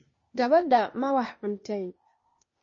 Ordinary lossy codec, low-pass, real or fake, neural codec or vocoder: MP3, 32 kbps; 7.2 kHz; fake; codec, 16 kHz, 0.8 kbps, ZipCodec